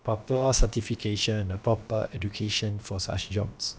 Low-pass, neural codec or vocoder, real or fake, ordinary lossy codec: none; codec, 16 kHz, about 1 kbps, DyCAST, with the encoder's durations; fake; none